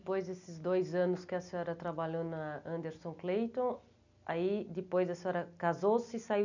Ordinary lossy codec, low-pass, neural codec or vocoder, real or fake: none; 7.2 kHz; none; real